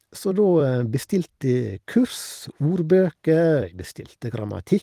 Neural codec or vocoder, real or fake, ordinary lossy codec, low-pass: vocoder, 44.1 kHz, 128 mel bands every 256 samples, BigVGAN v2; fake; Opus, 24 kbps; 14.4 kHz